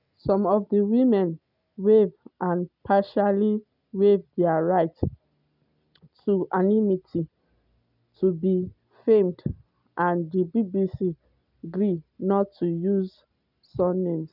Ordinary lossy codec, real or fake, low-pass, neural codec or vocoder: none; real; 5.4 kHz; none